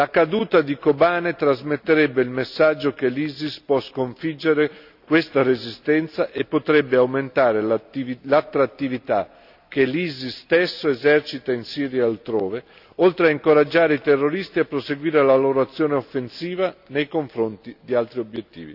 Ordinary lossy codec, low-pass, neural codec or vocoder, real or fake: none; 5.4 kHz; none; real